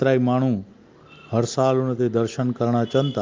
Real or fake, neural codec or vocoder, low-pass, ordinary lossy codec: real; none; 7.2 kHz; Opus, 32 kbps